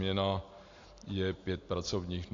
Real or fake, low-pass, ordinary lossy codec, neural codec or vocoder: real; 7.2 kHz; AAC, 48 kbps; none